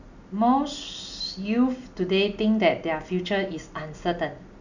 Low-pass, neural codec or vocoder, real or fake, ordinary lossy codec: 7.2 kHz; none; real; none